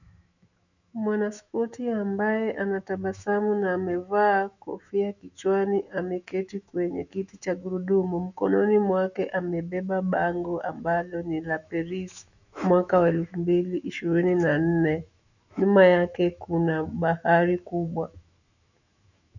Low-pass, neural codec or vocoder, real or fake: 7.2 kHz; autoencoder, 48 kHz, 128 numbers a frame, DAC-VAE, trained on Japanese speech; fake